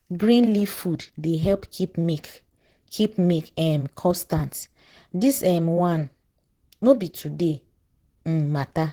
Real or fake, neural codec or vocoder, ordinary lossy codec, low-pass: fake; vocoder, 44.1 kHz, 128 mel bands, Pupu-Vocoder; Opus, 16 kbps; 19.8 kHz